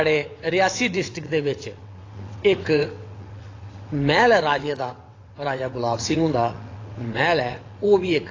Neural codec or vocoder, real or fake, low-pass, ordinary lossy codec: vocoder, 22.05 kHz, 80 mel bands, WaveNeXt; fake; 7.2 kHz; AAC, 32 kbps